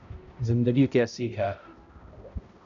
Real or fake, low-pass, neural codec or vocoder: fake; 7.2 kHz; codec, 16 kHz, 0.5 kbps, X-Codec, HuBERT features, trained on balanced general audio